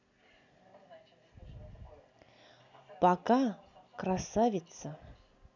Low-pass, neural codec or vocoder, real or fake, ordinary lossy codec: 7.2 kHz; none; real; none